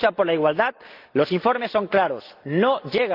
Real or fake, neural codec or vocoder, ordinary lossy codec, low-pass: real; none; Opus, 32 kbps; 5.4 kHz